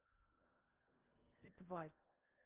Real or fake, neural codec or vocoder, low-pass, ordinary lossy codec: fake; codec, 16 kHz in and 24 kHz out, 0.8 kbps, FocalCodec, streaming, 65536 codes; 3.6 kHz; Opus, 32 kbps